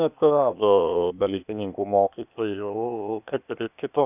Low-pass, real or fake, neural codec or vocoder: 3.6 kHz; fake; codec, 16 kHz, 0.8 kbps, ZipCodec